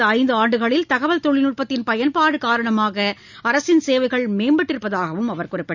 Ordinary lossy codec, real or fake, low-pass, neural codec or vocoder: none; real; 7.2 kHz; none